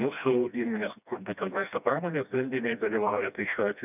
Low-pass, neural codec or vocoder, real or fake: 3.6 kHz; codec, 16 kHz, 1 kbps, FreqCodec, smaller model; fake